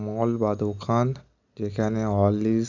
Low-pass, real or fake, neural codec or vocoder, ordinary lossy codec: 7.2 kHz; real; none; none